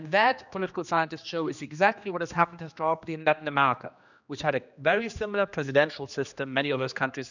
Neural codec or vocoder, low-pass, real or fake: codec, 16 kHz, 2 kbps, X-Codec, HuBERT features, trained on general audio; 7.2 kHz; fake